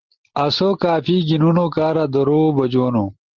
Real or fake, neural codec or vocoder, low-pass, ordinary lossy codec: real; none; 7.2 kHz; Opus, 16 kbps